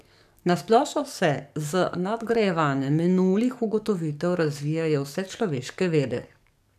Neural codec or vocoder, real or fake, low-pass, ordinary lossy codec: codec, 44.1 kHz, 7.8 kbps, DAC; fake; 14.4 kHz; none